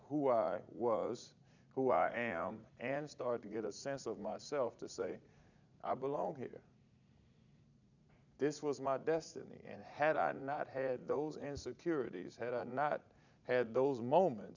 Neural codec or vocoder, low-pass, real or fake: vocoder, 44.1 kHz, 80 mel bands, Vocos; 7.2 kHz; fake